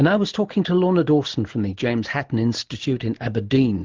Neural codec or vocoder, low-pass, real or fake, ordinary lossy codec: none; 7.2 kHz; real; Opus, 16 kbps